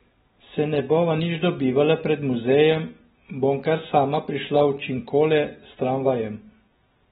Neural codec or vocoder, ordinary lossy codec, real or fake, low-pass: none; AAC, 16 kbps; real; 19.8 kHz